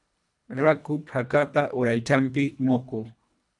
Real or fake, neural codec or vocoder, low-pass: fake; codec, 24 kHz, 1.5 kbps, HILCodec; 10.8 kHz